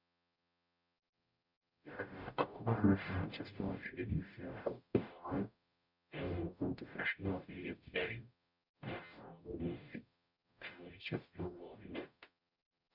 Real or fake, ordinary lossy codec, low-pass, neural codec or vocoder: fake; none; 5.4 kHz; codec, 44.1 kHz, 0.9 kbps, DAC